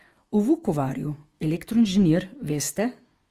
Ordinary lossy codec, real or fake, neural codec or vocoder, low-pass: Opus, 24 kbps; fake; autoencoder, 48 kHz, 128 numbers a frame, DAC-VAE, trained on Japanese speech; 14.4 kHz